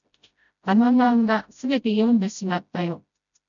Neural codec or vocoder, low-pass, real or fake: codec, 16 kHz, 0.5 kbps, FreqCodec, smaller model; 7.2 kHz; fake